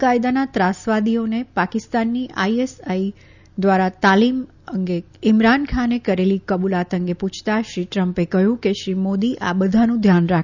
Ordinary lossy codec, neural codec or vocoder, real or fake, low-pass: none; none; real; 7.2 kHz